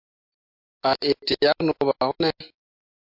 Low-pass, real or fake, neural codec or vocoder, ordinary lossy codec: 5.4 kHz; real; none; MP3, 48 kbps